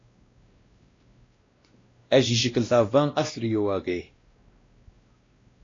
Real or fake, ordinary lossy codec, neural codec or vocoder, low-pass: fake; AAC, 32 kbps; codec, 16 kHz, 1 kbps, X-Codec, WavLM features, trained on Multilingual LibriSpeech; 7.2 kHz